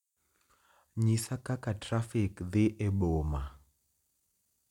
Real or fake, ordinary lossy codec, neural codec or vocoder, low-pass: real; none; none; 19.8 kHz